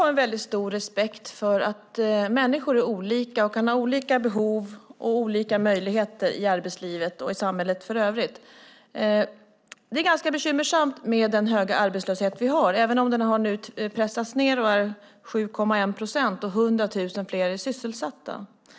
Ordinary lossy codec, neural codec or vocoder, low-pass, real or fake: none; none; none; real